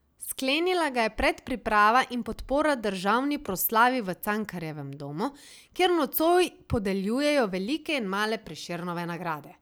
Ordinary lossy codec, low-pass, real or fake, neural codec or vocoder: none; none; real; none